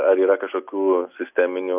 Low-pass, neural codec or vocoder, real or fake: 3.6 kHz; none; real